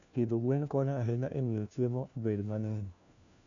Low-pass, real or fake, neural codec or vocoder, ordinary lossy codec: 7.2 kHz; fake; codec, 16 kHz, 1 kbps, FunCodec, trained on LibriTTS, 50 frames a second; none